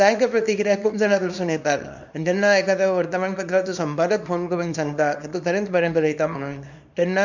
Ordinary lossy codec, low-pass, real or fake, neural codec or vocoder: none; 7.2 kHz; fake; codec, 24 kHz, 0.9 kbps, WavTokenizer, small release